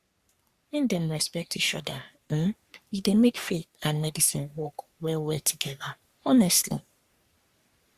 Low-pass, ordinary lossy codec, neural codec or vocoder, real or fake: 14.4 kHz; Opus, 64 kbps; codec, 44.1 kHz, 3.4 kbps, Pupu-Codec; fake